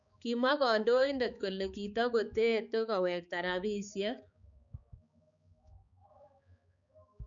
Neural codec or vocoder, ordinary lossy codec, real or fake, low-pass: codec, 16 kHz, 4 kbps, X-Codec, HuBERT features, trained on balanced general audio; none; fake; 7.2 kHz